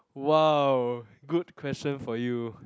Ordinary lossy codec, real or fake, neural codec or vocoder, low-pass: none; real; none; none